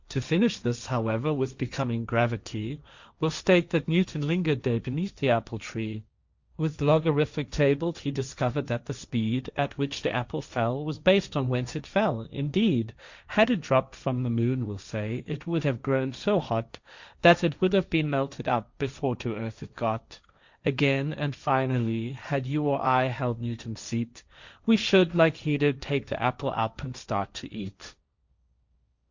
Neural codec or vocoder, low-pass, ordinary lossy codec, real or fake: codec, 16 kHz, 1.1 kbps, Voila-Tokenizer; 7.2 kHz; Opus, 64 kbps; fake